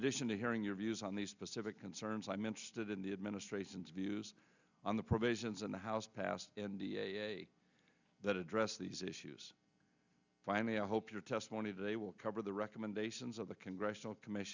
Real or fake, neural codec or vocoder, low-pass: real; none; 7.2 kHz